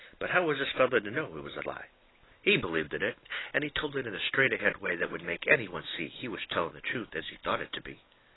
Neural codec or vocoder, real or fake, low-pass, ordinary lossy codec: none; real; 7.2 kHz; AAC, 16 kbps